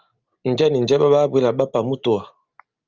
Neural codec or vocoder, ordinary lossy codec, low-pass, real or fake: none; Opus, 24 kbps; 7.2 kHz; real